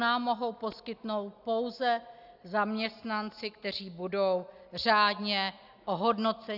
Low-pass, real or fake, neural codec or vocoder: 5.4 kHz; real; none